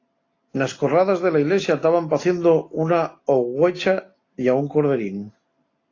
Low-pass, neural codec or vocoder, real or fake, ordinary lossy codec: 7.2 kHz; none; real; AAC, 32 kbps